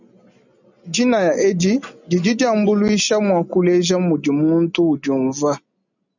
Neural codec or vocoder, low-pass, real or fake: none; 7.2 kHz; real